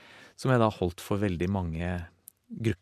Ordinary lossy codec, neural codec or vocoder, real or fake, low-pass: MP3, 64 kbps; none; real; 14.4 kHz